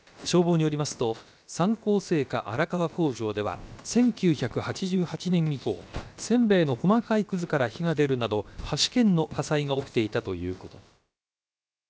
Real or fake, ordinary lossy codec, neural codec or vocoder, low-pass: fake; none; codec, 16 kHz, about 1 kbps, DyCAST, with the encoder's durations; none